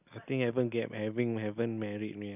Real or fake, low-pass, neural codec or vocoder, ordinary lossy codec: real; 3.6 kHz; none; none